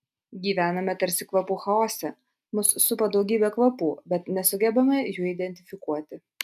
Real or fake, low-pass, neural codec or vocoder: real; 14.4 kHz; none